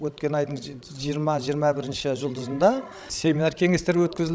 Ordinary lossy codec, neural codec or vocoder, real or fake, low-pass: none; codec, 16 kHz, 16 kbps, FreqCodec, larger model; fake; none